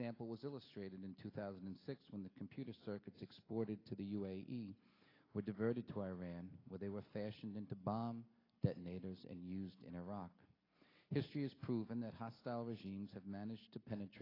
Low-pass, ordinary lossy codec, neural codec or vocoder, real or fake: 5.4 kHz; AAC, 24 kbps; none; real